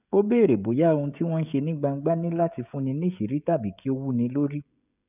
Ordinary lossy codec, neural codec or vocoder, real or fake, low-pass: none; codec, 16 kHz, 16 kbps, FreqCodec, smaller model; fake; 3.6 kHz